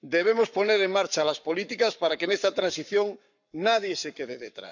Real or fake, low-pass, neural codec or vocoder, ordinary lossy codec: fake; 7.2 kHz; codec, 44.1 kHz, 7.8 kbps, Pupu-Codec; none